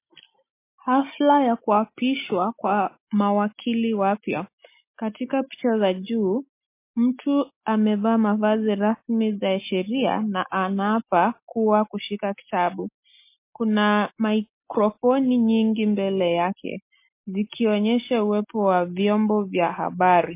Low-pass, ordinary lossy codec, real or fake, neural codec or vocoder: 3.6 kHz; MP3, 24 kbps; real; none